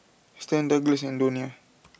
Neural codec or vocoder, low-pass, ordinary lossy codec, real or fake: none; none; none; real